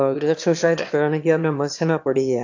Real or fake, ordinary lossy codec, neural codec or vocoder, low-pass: fake; AAC, 48 kbps; autoencoder, 22.05 kHz, a latent of 192 numbers a frame, VITS, trained on one speaker; 7.2 kHz